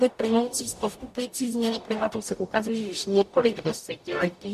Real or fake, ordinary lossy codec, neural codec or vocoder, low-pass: fake; MP3, 64 kbps; codec, 44.1 kHz, 0.9 kbps, DAC; 14.4 kHz